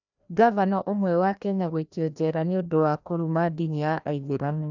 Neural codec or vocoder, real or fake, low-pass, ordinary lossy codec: codec, 16 kHz, 1 kbps, FreqCodec, larger model; fake; 7.2 kHz; none